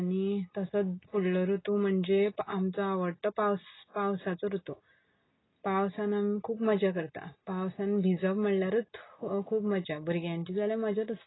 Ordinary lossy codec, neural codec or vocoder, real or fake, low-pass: AAC, 16 kbps; none; real; 7.2 kHz